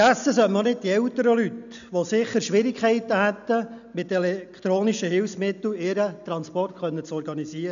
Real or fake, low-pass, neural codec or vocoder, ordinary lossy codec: real; 7.2 kHz; none; none